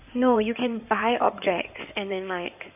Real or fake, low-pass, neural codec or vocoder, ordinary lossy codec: fake; 3.6 kHz; codec, 16 kHz in and 24 kHz out, 2.2 kbps, FireRedTTS-2 codec; none